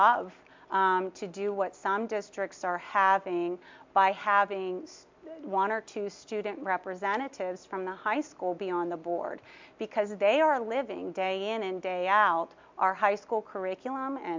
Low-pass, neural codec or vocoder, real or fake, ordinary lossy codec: 7.2 kHz; none; real; MP3, 64 kbps